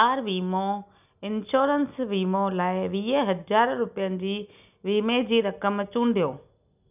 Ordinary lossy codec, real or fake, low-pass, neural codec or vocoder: none; real; 3.6 kHz; none